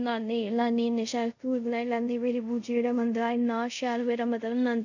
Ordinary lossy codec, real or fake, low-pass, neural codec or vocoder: none; fake; 7.2 kHz; codec, 24 kHz, 0.5 kbps, DualCodec